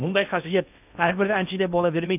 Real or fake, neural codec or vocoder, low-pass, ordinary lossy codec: fake; codec, 16 kHz in and 24 kHz out, 0.6 kbps, FocalCodec, streaming, 2048 codes; 3.6 kHz; none